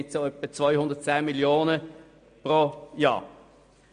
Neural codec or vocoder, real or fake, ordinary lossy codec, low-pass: none; real; MP3, 48 kbps; 9.9 kHz